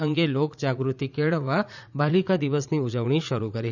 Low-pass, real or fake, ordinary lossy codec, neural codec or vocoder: 7.2 kHz; fake; none; vocoder, 44.1 kHz, 80 mel bands, Vocos